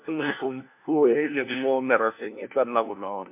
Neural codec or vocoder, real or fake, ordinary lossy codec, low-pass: codec, 16 kHz, 1 kbps, FunCodec, trained on LibriTTS, 50 frames a second; fake; MP3, 32 kbps; 3.6 kHz